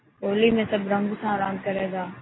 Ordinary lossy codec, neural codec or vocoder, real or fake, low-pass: AAC, 16 kbps; none; real; 7.2 kHz